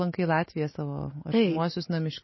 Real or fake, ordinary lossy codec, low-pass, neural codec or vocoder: real; MP3, 24 kbps; 7.2 kHz; none